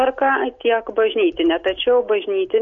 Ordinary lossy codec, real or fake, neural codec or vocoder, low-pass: MP3, 48 kbps; real; none; 7.2 kHz